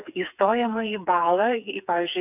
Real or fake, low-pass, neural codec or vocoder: fake; 3.6 kHz; codec, 16 kHz, 4 kbps, FreqCodec, smaller model